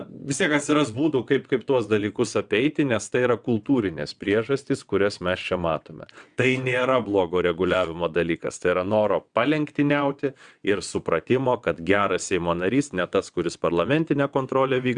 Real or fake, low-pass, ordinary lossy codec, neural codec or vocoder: fake; 9.9 kHz; Opus, 64 kbps; vocoder, 22.05 kHz, 80 mel bands, WaveNeXt